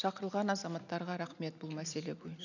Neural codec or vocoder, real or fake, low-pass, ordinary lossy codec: none; real; 7.2 kHz; none